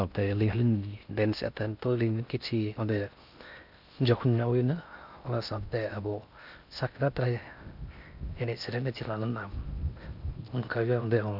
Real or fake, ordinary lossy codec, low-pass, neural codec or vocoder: fake; none; 5.4 kHz; codec, 16 kHz in and 24 kHz out, 0.6 kbps, FocalCodec, streaming, 4096 codes